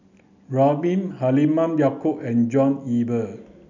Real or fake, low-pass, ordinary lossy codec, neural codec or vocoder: real; 7.2 kHz; none; none